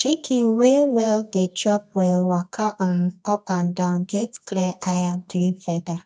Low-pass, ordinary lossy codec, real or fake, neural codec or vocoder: 9.9 kHz; none; fake; codec, 24 kHz, 0.9 kbps, WavTokenizer, medium music audio release